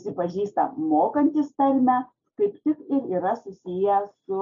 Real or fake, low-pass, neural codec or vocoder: real; 7.2 kHz; none